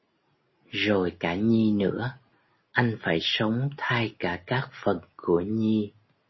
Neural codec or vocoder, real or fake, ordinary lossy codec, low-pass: none; real; MP3, 24 kbps; 7.2 kHz